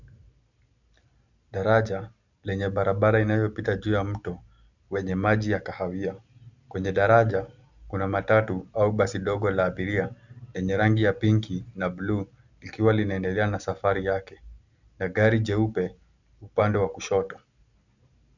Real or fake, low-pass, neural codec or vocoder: real; 7.2 kHz; none